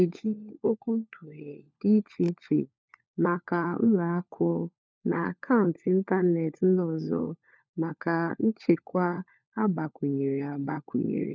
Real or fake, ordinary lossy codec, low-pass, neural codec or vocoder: fake; none; none; codec, 16 kHz, 4 kbps, FunCodec, trained on LibriTTS, 50 frames a second